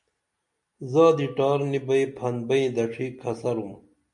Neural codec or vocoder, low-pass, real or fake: none; 10.8 kHz; real